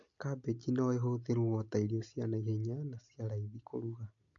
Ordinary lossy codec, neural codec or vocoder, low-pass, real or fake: AAC, 64 kbps; none; 7.2 kHz; real